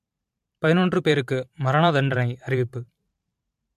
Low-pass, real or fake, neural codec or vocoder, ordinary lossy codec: 14.4 kHz; fake; vocoder, 44.1 kHz, 128 mel bands every 512 samples, BigVGAN v2; MP3, 64 kbps